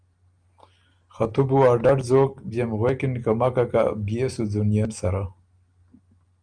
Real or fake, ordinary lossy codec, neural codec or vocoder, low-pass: real; Opus, 32 kbps; none; 9.9 kHz